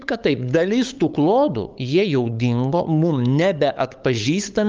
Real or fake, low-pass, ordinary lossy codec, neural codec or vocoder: fake; 7.2 kHz; Opus, 24 kbps; codec, 16 kHz, 8 kbps, FunCodec, trained on LibriTTS, 25 frames a second